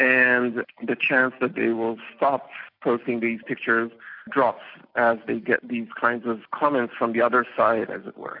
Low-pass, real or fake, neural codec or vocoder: 5.4 kHz; real; none